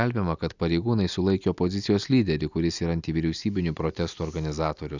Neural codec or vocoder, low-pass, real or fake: none; 7.2 kHz; real